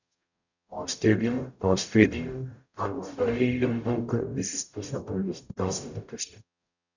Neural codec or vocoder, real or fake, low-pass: codec, 44.1 kHz, 0.9 kbps, DAC; fake; 7.2 kHz